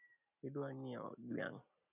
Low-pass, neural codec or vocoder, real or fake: 3.6 kHz; none; real